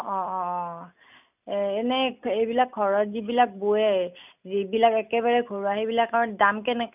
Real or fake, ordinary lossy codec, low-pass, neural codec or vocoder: real; none; 3.6 kHz; none